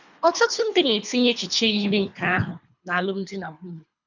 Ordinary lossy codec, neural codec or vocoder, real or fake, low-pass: none; codec, 24 kHz, 3 kbps, HILCodec; fake; 7.2 kHz